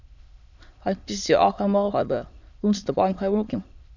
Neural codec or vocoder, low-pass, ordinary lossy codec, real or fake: autoencoder, 22.05 kHz, a latent of 192 numbers a frame, VITS, trained on many speakers; 7.2 kHz; none; fake